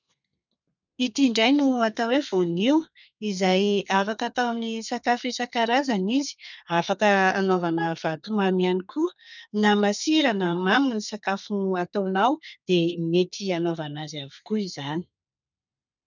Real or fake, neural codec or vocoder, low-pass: fake; codec, 32 kHz, 1.9 kbps, SNAC; 7.2 kHz